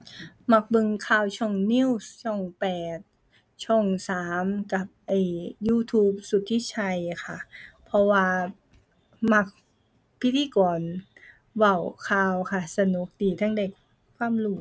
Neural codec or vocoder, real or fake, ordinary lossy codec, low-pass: none; real; none; none